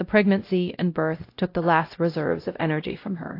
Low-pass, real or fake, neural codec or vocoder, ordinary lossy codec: 5.4 kHz; fake; codec, 16 kHz, 0.5 kbps, X-Codec, WavLM features, trained on Multilingual LibriSpeech; AAC, 32 kbps